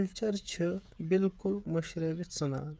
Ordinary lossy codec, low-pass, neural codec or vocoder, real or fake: none; none; codec, 16 kHz, 8 kbps, FreqCodec, smaller model; fake